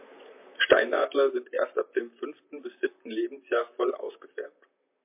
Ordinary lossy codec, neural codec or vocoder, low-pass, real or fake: MP3, 24 kbps; vocoder, 44.1 kHz, 128 mel bands, Pupu-Vocoder; 3.6 kHz; fake